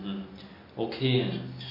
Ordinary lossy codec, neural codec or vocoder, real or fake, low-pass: MP3, 32 kbps; none; real; 5.4 kHz